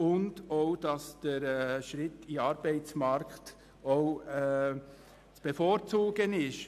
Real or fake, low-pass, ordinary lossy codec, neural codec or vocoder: real; 14.4 kHz; AAC, 96 kbps; none